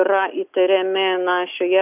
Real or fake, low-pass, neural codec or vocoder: real; 3.6 kHz; none